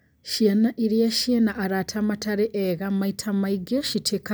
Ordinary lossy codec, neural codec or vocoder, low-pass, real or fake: none; none; none; real